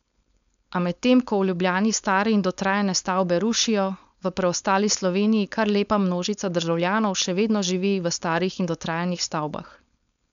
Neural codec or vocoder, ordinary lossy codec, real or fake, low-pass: codec, 16 kHz, 4.8 kbps, FACodec; MP3, 96 kbps; fake; 7.2 kHz